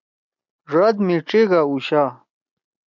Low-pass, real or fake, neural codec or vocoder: 7.2 kHz; real; none